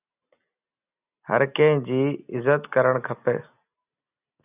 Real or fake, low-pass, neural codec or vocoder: real; 3.6 kHz; none